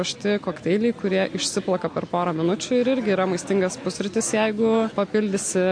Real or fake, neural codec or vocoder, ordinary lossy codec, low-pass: real; none; MP3, 48 kbps; 9.9 kHz